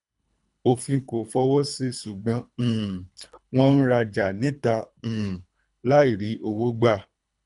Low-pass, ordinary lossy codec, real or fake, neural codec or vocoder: 10.8 kHz; none; fake; codec, 24 kHz, 3 kbps, HILCodec